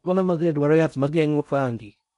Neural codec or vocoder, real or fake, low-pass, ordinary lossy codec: codec, 16 kHz in and 24 kHz out, 0.6 kbps, FocalCodec, streaming, 4096 codes; fake; 10.8 kHz; none